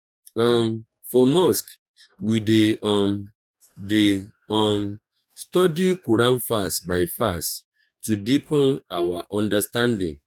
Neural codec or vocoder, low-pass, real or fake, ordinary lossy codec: codec, 44.1 kHz, 2.6 kbps, DAC; 19.8 kHz; fake; none